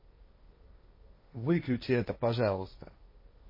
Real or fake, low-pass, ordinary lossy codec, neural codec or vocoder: fake; 5.4 kHz; MP3, 24 kbps; codec, 16 kHz, 1.1 kbps, Voila-Tokenizer